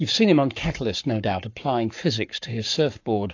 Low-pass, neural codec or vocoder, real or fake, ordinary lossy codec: 7.2 kHz; codec, 44.1 kHz, 7.8 kbps, Pupu-Codec; fake; AAC, 48 kbps